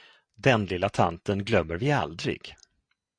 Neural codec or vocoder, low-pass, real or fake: none; 9.9 kHz; real